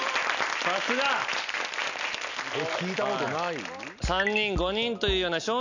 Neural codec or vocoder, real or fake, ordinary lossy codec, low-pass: none; real; none; 7.2 kHz